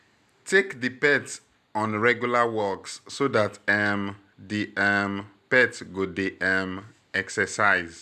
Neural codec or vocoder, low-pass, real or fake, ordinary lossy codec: none; 14.4 kHz; real; none